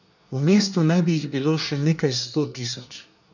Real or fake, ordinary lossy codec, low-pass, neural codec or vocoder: fake; none; 7.2 kHz; codec, 24 kHz, 1 kbps, SNAC